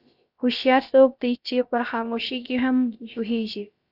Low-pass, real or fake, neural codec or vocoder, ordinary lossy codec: 5.4 kHz; fake; codec, 16 kHz, about 1 kbps, DyCAST, with the encoder's durations; Opus, 64 kbps